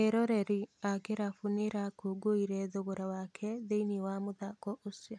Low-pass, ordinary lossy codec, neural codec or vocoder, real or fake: none; none; none; real